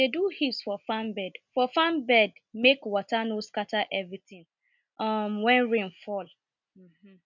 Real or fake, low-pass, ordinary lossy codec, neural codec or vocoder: real; 7.2 kHz; none; none